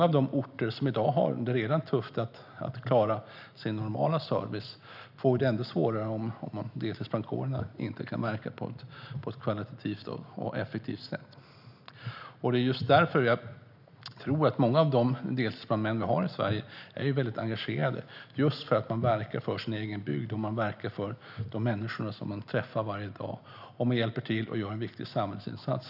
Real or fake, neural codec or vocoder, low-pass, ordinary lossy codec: real; none; 5.4 kHz; none